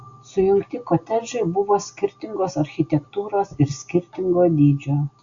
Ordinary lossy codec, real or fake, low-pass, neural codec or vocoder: Opus, 64 kbps; real; 7.2 kHz; none